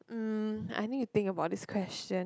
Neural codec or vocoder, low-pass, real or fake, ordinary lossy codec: none; none; real; none